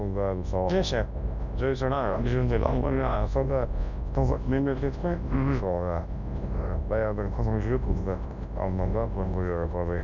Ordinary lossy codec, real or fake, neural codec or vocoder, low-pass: none; fake; codec, 24 kHz, 0.9 kbps, WavTokenizer, large speech release; 7.2 kHz